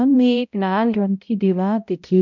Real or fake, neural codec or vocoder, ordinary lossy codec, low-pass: fake; codec, 16 kHz, 0.5 kbps, X-Codec, HuBERT features, trained on balanced general audio; none; 7.2 kHz